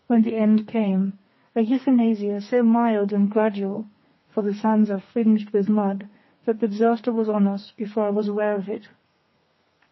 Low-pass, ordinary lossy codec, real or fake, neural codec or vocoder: 7.2 kHz; MP3, 24 kbps; fake; codec, 32 kHz, 1.9 kbps, SNAC